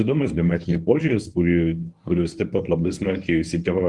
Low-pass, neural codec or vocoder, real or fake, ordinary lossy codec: 10.8 kHz; codec, 24 kHz, 0.9 kbps, WavTokenizer, medium speech release version 1; fake; Opus, 24 kbps